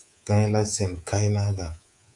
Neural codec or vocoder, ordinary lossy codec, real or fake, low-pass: codec, 24 kHz, 3.1 kbps, DualCodec; AAC, 64 kbps; fake; 10.8 kHz